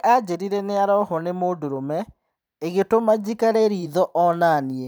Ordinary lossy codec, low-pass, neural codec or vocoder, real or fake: none; none; vocoder, 44.1 kHz, 128 mel bands every 512 samples, BigVGAN v2; fake